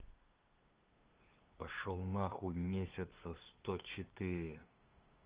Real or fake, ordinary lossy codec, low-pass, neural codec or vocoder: fake; Opus, 16 kbps; 3.6 kHz; codec, 16 kHz, 2 kbps, FunCodec, trained on LibriTTS, 25 frames a second